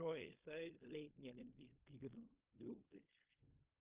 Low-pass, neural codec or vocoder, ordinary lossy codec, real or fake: 3.6 kHz; codec, 16 kHz in and 24 kHz out, 0.4 kbps, LongCat-Audio-Codec, fine tuned four codebook decoder; Opus, 24 kbps; fake